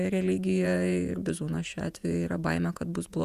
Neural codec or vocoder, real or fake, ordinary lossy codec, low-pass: vocoder, 48 kHz, 128 mel bands, Vocos; fake; Opus, 64 kbps; 14.4 kHz